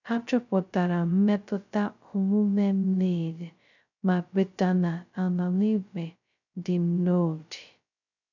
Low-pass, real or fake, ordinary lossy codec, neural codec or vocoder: 7.2 kHz; fake; none; codec, 16 kHz, 0.2 kbps, FocalCodec